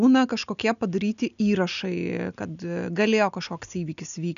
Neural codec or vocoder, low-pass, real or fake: none; 7.2 kHz; real